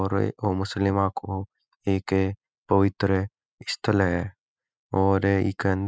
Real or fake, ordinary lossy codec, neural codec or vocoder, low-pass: real; none; none; none